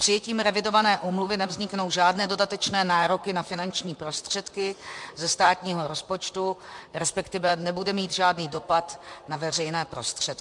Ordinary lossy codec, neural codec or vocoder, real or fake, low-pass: MP3, 64 kbps; vocoder, 44.1 kHz, 128 mel bands, Pupu-Vocoder; fake; 10.8 kHz